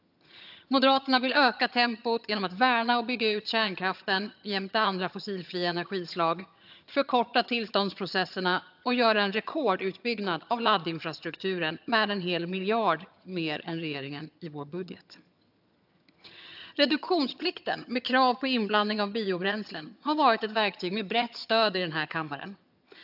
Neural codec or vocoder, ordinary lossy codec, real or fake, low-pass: vocoder, 22.05 kHz, 80 mel bands, HiFi-GAN; AAC, 48 kbps; fake; 5.4 kHz